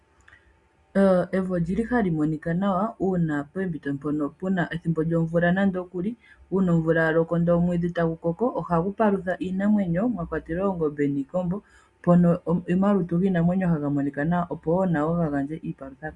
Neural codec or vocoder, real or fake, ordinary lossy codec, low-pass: none; real; Opus, 64 kbps; 10.8 kHz